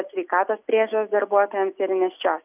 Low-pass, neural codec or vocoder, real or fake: 3.6 kHz; none; real